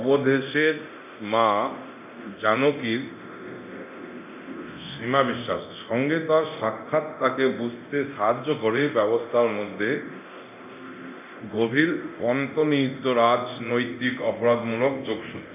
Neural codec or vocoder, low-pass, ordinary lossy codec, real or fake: codec, 24 kHz, 0.9 kbps, DualCodec; 3.6 kHz; none; fake